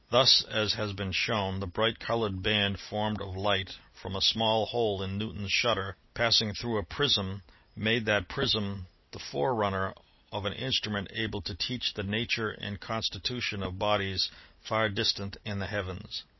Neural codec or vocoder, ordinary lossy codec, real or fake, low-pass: none; MP3, 24 kbps; real; 7.2 kHz